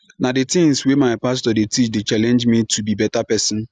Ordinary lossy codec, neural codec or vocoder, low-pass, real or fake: none; none; 9.9 kHz; real